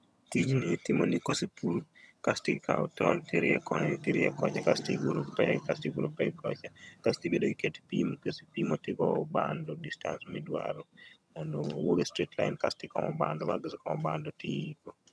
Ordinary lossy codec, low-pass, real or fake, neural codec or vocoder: none; none; fake; vocoder, 22.05 kHz, 80 mel bands, HiFi-GAN